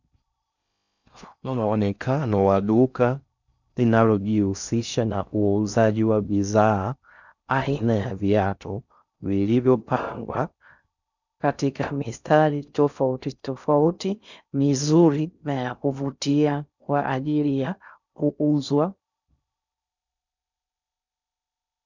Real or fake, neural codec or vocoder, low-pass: fake; codec, 16 kHz in and 24 kHz out, 0.6 kbps, FocalCodec, streaming, 4096 codes; 7.2 kHz